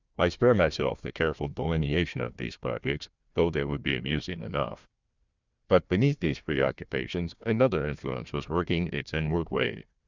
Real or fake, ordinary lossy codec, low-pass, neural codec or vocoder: fake; Opus, 64 kbps; 7.2 kHz; codec, 16 kHz, 1 kbps, FunCodec, trained on Chinese and English, 50 frames a second